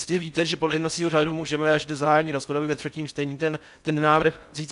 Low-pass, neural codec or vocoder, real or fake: 10.8 kHz; codec, 16 kHz in and 24 kHz out, 0.6 kbps, FocalCodec, streaming, 4096 codes; fake